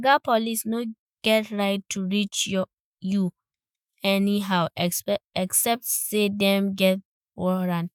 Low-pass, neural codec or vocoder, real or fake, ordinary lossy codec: none; autoencoder, 48 kHz, 128 numbers a frame, DAC-VAE, trained on Japanese speech; fake; none